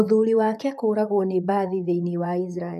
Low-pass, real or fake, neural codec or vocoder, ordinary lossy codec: 14.4 kHz; fake; vocoder, 44.1 kHz, 128 mel bands, Pupu-Vocoder; none